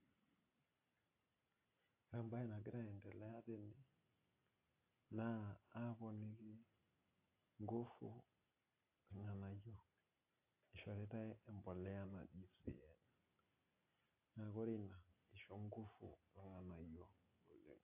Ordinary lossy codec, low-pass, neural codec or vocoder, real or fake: AAC, 32 kbps; 3.6 kHz; none; real